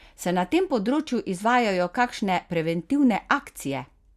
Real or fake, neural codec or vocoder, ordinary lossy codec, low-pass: real; none; Opus, 64 kbps; 14.4 kHz